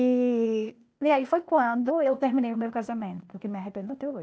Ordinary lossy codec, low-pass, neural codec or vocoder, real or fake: none; none; codec, 16 kHz, 0.8 kbps, ZipCodec; fake